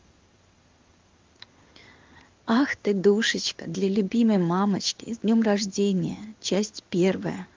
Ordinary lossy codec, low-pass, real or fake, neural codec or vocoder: Opus, 32 kbps; 7.2 kHz; fake; codec, 16 kHz in and 24 kHz out, 1 kbps, XY-Tokenizer